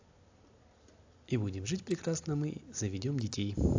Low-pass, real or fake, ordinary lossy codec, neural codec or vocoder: 7.2 kHz; real; AAC, 48 kbps; none